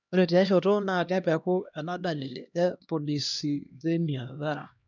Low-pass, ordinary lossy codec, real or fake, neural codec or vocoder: 7.2 kHz; none; fake; codec, 16 kHz, 1 kbps, X-Codec, HuBERT features, trained on LibriSpeech